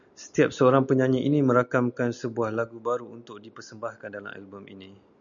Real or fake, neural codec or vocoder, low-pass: real; none; 7.2 kHz